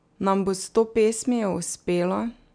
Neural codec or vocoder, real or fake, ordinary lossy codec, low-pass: none; real; none; 9.9 kHz